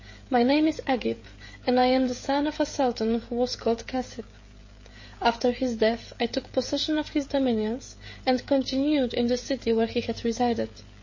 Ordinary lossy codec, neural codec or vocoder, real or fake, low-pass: MP3, 32 kbps; codec, 16 kHz, 16 kbps, FreqCodec, smaller model; fake; 7.2 kHz